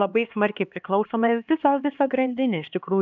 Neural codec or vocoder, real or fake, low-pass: codec, 16 kHz, 2 kbps, X-Codec, HuBERT features, trained on LibriSpeech; fake; 7.2 kHz